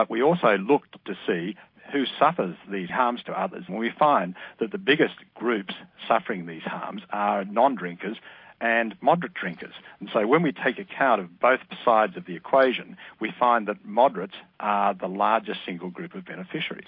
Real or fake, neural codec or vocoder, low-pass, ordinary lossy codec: real; none; 5.4 kHz; MP3, 32 kbps